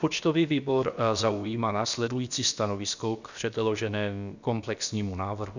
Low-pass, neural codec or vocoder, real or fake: 7.2 kHz; codec, 16 kHz, about 1 kbps, DyCAST, with the encoder's durations; fake